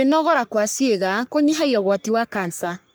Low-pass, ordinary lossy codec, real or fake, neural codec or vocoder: none; none; fake; codec, 44.1 kHz, 3.4 kbps, Pupu-Codec